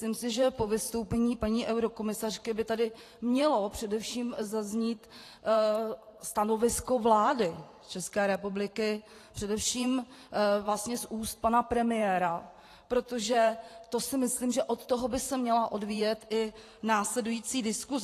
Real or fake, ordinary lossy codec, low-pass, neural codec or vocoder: fake; AAC, 48 kbps; 14.4 kHz; vocoder, 44.1 kHz, 128 mel bands every 512 samples, BigVGAN v2